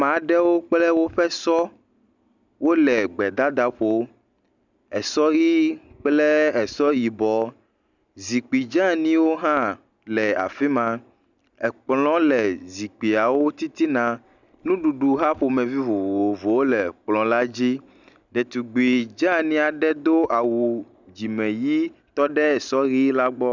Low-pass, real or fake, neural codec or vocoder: 7.2 kHz; real; none